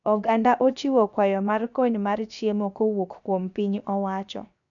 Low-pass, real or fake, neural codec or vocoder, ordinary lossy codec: 7.2 kHz; fake; codec, 16 kHz, 0.7 kbps, FocalCodec; none